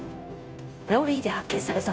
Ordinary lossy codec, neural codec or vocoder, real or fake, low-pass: none; codec, 16 kHz, 0.5 kbps, FunCodec, trained on Chinese and English, 25 frames a second; fake; none